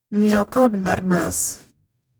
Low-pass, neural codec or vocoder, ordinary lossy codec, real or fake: none; codec, 44.1 kHz, 0.9 kbps, DAC; none; fake